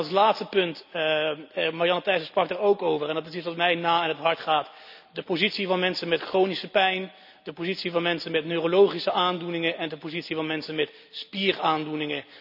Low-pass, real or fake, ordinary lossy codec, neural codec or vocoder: 5.4 kHz; real; none; none